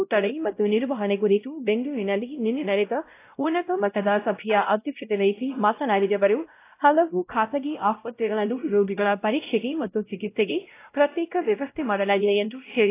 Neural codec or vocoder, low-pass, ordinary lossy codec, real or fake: codec, 16 kHz, 0.5 kbps, X-Codec, WavLM features, trained on Multilingual LibriSpeech; 3.6 kHz; AAC, 24 kbps; fake